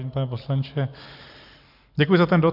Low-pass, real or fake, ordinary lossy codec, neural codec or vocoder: 5.4 kHz; real; AAC, 48 kbps; none